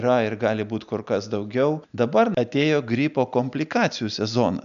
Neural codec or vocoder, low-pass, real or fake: none; 7.2 kHz; real